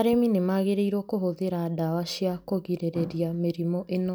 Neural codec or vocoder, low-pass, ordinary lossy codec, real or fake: none; none; none; real